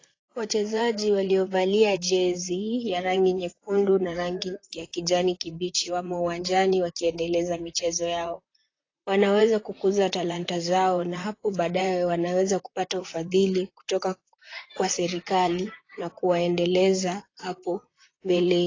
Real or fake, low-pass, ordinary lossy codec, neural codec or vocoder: fake; 7.2 kHz; AAC, 32 kbps; vocoder, 44.1 kHz, 128 mel bands, Pupu-Vocoder